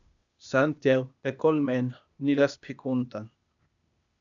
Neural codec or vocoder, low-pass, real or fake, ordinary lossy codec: codec, 16 kHz, 0.8 kbps, ZipCodec; 7.2 kHz; fake; MP3, 96 kbps